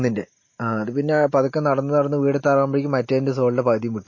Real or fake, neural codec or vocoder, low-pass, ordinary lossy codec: real; none; 7.2 kHz; MP3, 32 kbps